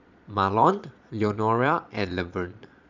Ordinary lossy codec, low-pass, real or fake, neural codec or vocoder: none; 7.2 kHz; fake; vocoder, 22.05 kHz, 80 mel bands, Vocos